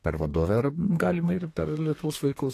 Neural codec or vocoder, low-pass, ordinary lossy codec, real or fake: codec, 32 kHz, 1.9 kbps, SNAC; 14.4 kHz; AAC, 48 kbps; fake